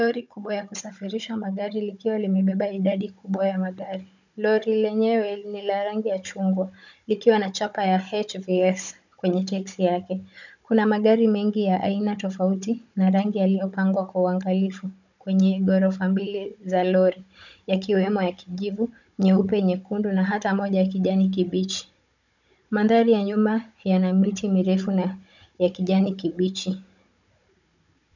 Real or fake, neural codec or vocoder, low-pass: fake; codec, 16 kHz, 16 kbps, FunCodec, trained on Chinese and English, 50 frames a second; 7.2 kHz